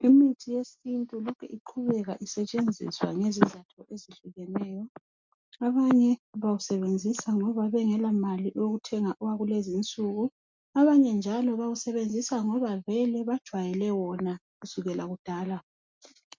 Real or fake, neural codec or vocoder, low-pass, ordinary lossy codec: real; none; 7.2 kHz; MP3, 64 kbps